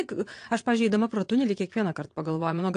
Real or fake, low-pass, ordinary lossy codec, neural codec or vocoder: fake; 9.9 kHz; AAC, 48 kbps; vocoder, 22.05 kHz, 80 mel bands, WaveNeXt